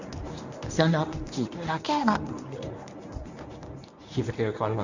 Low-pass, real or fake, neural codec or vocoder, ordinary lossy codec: 7.2 kHz; fake; codec, 24 kHz, 0.9 kbps, WavTokenizer, medium speech release version 1; none